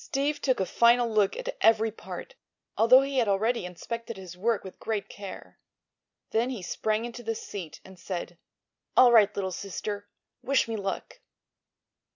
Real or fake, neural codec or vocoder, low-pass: real; none; 7.2 kHz